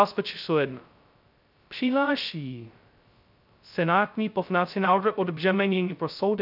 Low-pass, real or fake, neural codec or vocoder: 5.4 kHz; fake; codec, 16 kHz, 0.2 kbps, FocalCodec